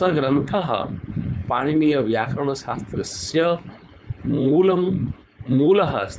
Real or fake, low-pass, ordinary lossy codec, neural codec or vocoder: fake; none; none; codec, 16 kHz, 4.8 kbps, FACodec